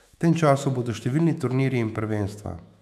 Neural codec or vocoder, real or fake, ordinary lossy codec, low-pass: autoencoder, 48 kHz, 128 numbers a frame, DAC-VAE, trained on Japanese speech; fake; none; 14.4 kHz